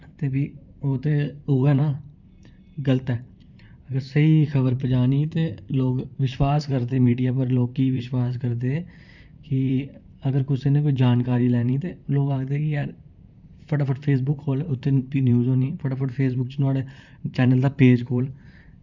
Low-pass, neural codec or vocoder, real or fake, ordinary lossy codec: 7.2 kHz; vocoder, 44.1 kHz, 128 mel bands, Pupu-Vocoder; fake; none